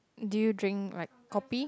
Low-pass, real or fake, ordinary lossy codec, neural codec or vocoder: none; real; none; none